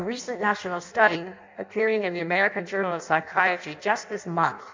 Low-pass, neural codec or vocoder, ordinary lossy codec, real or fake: 7.2 kHz; codec, 16 kHz in and 24 kHz out, 0.6 kbps, FireRedTTS-2 codec; MP3, 64 kbps; fake